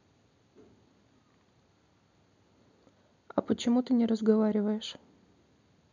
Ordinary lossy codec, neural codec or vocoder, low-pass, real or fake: none; none; 7.2 kHz; real